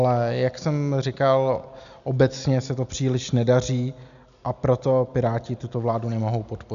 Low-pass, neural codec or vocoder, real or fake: 7.2 kHz; none; real